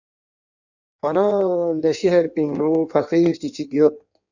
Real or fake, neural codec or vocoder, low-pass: fake; codec, 16 kHz in and 24 kHz out, 1.1 kbps, FireRedTTS-2 codec; 7.2 kHz